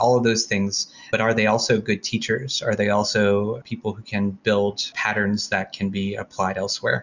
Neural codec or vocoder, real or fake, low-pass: none; real; 7.2 kHz